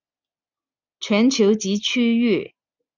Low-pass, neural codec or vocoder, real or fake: 7.2 kHz; none; real